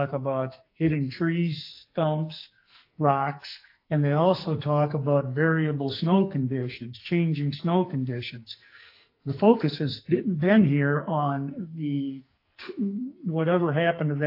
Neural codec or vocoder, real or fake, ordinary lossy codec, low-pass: codec, 44.1 kHz, 2.6 kbps, SNAC; fake; AAC, 32 kbps; 5.4 kHz